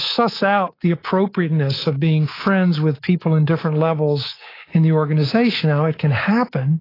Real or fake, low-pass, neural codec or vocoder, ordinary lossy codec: fake; 5.4 kHz; codec, 24 kHz, 3.1 kbps, DualCodec; AAC, 24 kbps